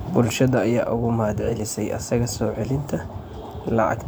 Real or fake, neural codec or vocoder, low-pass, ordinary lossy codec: fake; vocoder, 44.1 kHz, 128 mel bands every 512 samples, BigVGAN v2; none; none